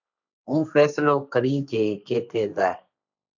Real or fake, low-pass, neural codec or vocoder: fake; 7.2 kHz; codec, 16 kHz, 1.1 kbps, Voila-Tokenizer